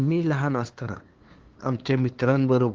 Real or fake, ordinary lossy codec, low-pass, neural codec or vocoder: fake; Opus, 16 kbps; 7.2 kHz; codec, 16 kHz, 2 kbps, FunCodec, trained on LibriTTS, 25 frames a second